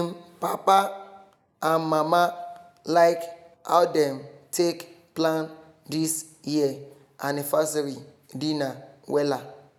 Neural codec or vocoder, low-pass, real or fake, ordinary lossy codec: none; none; real; none